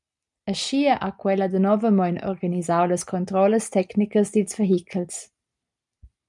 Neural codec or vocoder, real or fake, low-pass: none; real; 10.8 kHz